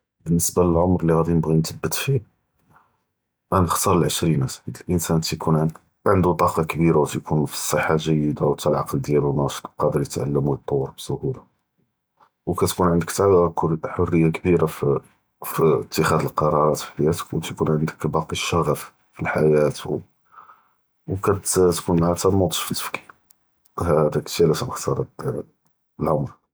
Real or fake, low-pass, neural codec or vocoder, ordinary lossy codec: real; none; none; none